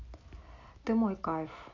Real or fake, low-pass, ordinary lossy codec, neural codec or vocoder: real; 7.2 kHz; AAC, 32 kbps; none